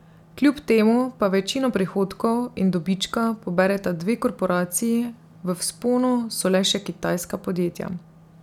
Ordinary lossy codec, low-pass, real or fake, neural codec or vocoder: none; 19.8 kHz; real; none